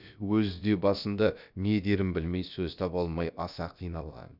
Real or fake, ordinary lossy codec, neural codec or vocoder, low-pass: fake; none; codec, 16 kHz, about 1 kbps, DyCAST, with the encoder's durations; 5.4 kHz